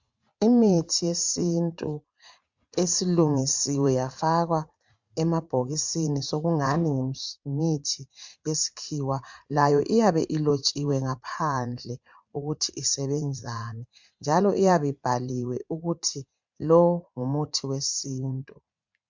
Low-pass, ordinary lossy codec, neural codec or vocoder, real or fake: 7.2 kHz; MP3, 64 kbps; vocoder, 44.1 kHz, 80 mel bands, Vocos; fake